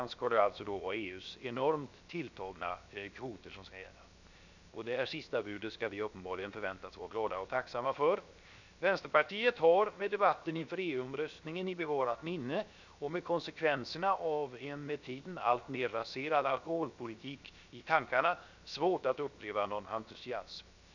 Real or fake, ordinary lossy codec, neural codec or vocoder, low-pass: fake; none; codec, 16 kHz, 0.7 kbps, FocalCodec; 7.2 kHz